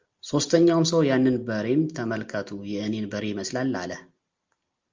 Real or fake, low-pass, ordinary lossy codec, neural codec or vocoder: real; 7.2 kHz; Opus, 32 kbps; none